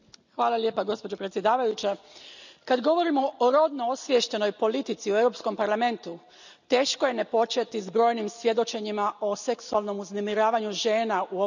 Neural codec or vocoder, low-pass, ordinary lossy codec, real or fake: none; 7.2 kHz; none; real